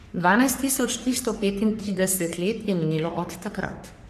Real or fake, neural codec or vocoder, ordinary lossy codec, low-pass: fake; codec, 44.1 kHz, 3.4 kbps, Pupu-Codec; none; 14.4 kHz